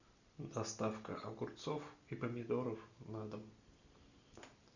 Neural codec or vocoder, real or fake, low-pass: none; real; 7.2 kHz